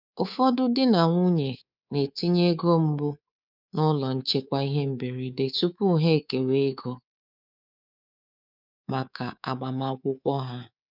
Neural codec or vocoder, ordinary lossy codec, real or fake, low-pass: codec, 24 kHz, 3.1 kbps, DualCodec; none; fake; 5.4 kHz